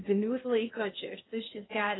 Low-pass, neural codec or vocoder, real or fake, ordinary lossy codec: 7.2 kHz; codec, 16 kHz in and 24 kHz out, 0.6 kbps, FocalCodec, streaming, 2048 codes; fake; AAC, 16 kbps